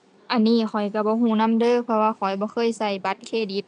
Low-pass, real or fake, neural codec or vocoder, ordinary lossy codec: 9.9 kHz; real; none; MP3, 96 kbps